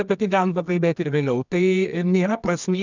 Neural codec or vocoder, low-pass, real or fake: codec, 24 kHz, 0.9 kbps, WavTokenizer, medium music audio release; 7.2 kHz; fake